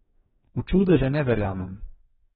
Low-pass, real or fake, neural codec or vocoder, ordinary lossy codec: 19.8 kHz; fake; codec, 44.1 kHz, 2.6 kbps, DAC; AAC, 16 kbps